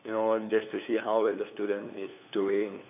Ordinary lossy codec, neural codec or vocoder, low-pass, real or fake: none; codec, 16 kHz, 2 kbps, FunCodec, trained on LibriTTS, 25 frames a second; 3.6 kHz; fake